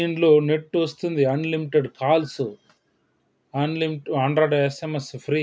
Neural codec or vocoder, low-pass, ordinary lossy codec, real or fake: none; none; none; real